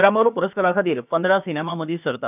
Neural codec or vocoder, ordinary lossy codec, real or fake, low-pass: codec, 16 kHz, about 1 kbps, DyCAST, with the encoder's durations; none; fake; 3.6 kHz